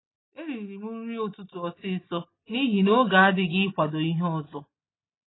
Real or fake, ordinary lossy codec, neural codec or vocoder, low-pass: real; AAC, 16 kbps; none; 7.2 kHz